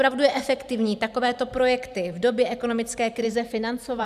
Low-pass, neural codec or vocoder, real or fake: 14.4 kHz; vocoder, 44.1 kHz, 128 mel bands every 512 samples, BigVGAN v2; fake